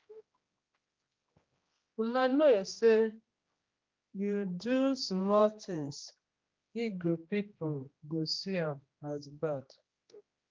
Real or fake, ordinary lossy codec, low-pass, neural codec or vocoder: fake; Opus, 24 kbps; 7.2 kHz; codec, 16 kHz, 1 kbps, X-Codec, HuBERT features, trained on general audio